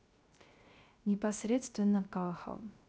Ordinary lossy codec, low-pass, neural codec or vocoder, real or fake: none; none; codec, 16 kHz, 0.3 kbps, FocalCodec; fake